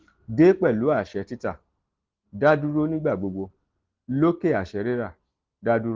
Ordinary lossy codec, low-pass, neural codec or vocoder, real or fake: Opus, 16 kbps; 7.2 kHz; none; real